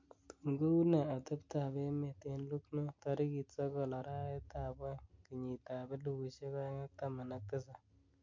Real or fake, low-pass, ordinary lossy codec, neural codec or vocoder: real; 7.2 kHz; MP3, 48 kbps; none